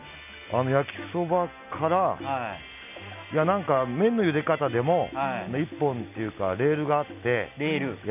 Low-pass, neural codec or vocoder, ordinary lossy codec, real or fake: 3.6 kHz; none; none; real